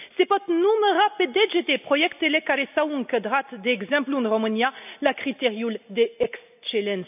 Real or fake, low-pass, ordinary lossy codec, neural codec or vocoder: real; 3.6 kHz; none; none